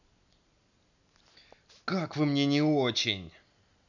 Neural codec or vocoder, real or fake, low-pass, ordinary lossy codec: none; real; 7.2 kHz; none